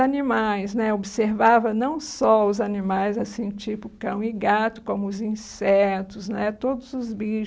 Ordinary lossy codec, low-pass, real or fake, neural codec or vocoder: none; none; real; none